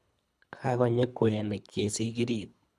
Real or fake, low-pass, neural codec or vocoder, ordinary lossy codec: fake; none; codec, 24 kHz, 3 kbps, HILCodec; none